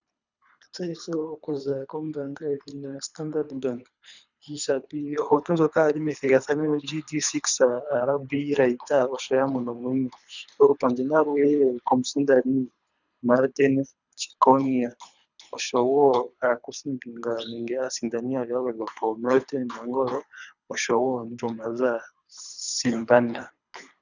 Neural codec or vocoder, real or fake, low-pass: codec, 24 kHz, 3 kbps, HILCodec; fake; 7.2 kHz